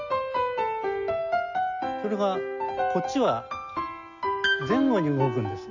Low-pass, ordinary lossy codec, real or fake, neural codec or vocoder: 7.2 kHz; none; real; none